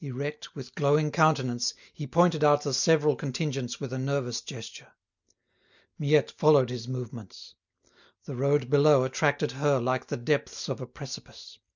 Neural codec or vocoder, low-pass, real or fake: none; 7.2 kHz; real